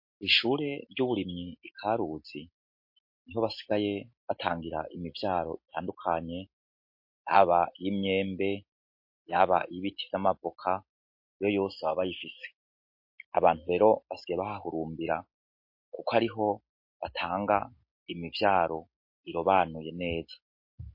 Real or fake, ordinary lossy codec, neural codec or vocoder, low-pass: real; MP3, 32 kbps; none; 5.4 kHz